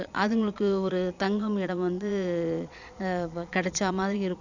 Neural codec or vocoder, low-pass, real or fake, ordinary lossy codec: vocoder, 22.05 kHz, 80 mel bands, Vocos; 7.2 kHz; fake; none